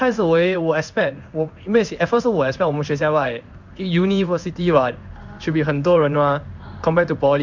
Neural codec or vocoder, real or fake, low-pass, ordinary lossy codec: codec, 16 kHz in and 24 kHz out, 1 kbps, XY-Tokenizer; fake; 7.2 kHz; none